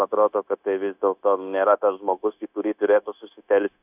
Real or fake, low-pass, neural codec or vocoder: fake; 3.6 kHz; codec, 16 kHz, 0.9 kbps, LongCat-Audio-Codec